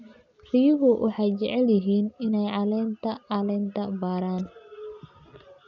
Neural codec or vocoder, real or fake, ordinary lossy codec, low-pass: none; real; none; 7.2 kHz